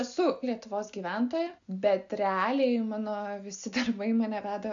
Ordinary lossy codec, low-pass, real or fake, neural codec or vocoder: AAC, 64 kbps; 7.2 kHz; real; none